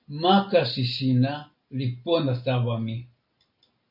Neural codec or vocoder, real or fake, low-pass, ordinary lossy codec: none; real; 5.4 kHz; AAC, 48 kbps